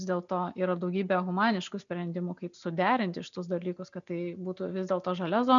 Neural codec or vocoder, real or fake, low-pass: none; real; 7.2 kHz